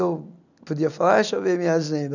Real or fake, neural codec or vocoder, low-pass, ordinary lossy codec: real; none; 7.2 kHz; none